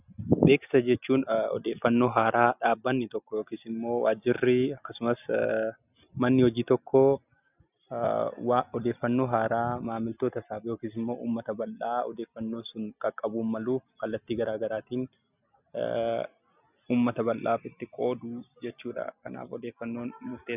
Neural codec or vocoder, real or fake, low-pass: none; real; 3.6 kHz